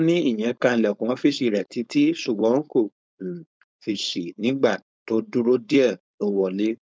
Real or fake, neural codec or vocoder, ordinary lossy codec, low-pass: fake; codec, 16 kHz, 4.8 kbps, FACodec; none; none